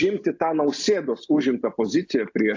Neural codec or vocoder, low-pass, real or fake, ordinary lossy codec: none; 7.2 kHz; real; AAC, 48 kbps